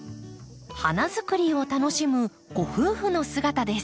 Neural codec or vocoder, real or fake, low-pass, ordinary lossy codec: none; real; none; none